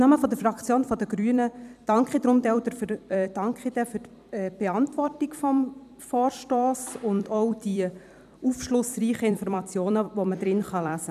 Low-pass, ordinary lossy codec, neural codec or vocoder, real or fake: 14.4 kHz; none; vocoder, 44.1 kHz, 128 mel bands every 256 samples, BigVGAN v2; fake